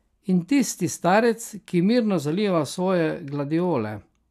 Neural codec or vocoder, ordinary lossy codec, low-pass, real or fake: none; none; 14.4 kHz; real